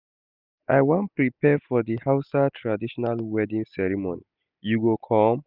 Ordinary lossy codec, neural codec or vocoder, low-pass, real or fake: none; none; 5.4 kHz; real